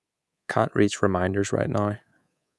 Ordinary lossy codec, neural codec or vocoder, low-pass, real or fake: none; codec, 24 kHz, 3.1 kbps, DualCodec; none; fake